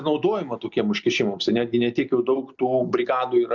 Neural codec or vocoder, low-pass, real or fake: none; 7.2 kHz; real